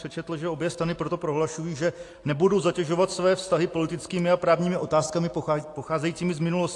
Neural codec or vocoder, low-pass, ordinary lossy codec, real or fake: none; 10.8 kHz; AAC, 48 kbps; real